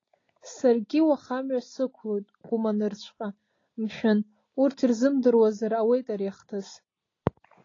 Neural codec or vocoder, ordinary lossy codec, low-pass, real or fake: none; AAC, 32 kbps; 7.2 kHz; real